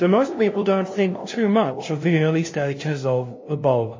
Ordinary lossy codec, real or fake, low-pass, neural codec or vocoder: MP3, 32 kbps; fake; 7.2 kHz; codec, 16 kHz, 0.5 kbps, FunCodec, trained on LibriTTS, 25 frames a second